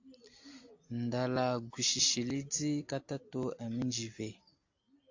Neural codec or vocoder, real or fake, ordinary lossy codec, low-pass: none; real; AAC, 48 kbps; 7.2 kHz